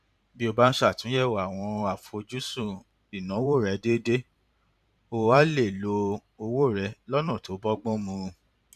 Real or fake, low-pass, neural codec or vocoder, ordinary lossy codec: fake; 14.4 kHz; vocoder, 44.1 kHz, 128 mel bands every 512 samples, BigVGAN v2; none